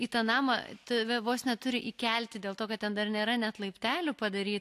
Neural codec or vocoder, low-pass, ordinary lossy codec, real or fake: none; 14.4 kHz; AAC, 64 kbps; real